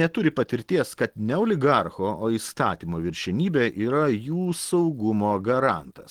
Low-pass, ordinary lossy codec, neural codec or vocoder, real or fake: 19.8 kHz; Opus, 16 kbps; none; real